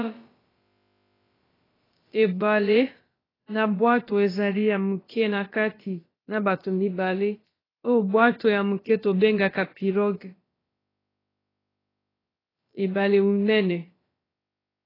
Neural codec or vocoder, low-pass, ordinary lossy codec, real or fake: codec, 16 kHz, about 1 kbps, DyCAST, with the encoder's durations; 5.4 kHz; AAC, 24 kbps; fake